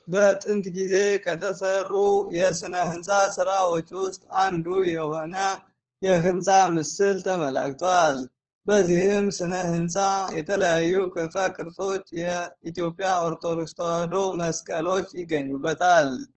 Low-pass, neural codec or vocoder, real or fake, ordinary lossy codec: 7.2 kHz; codec, 16 kHz, 4 kbps, FunCodec, trained on LibriTTS, 50 frames a second; fake; Opus, 16 kbps